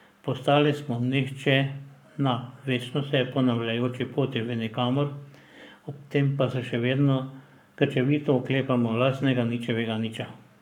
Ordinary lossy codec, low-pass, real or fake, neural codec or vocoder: none; 19.8 kHz; fake; codec, 44.1 kHz, 7.8 kbps, Pupu-Codec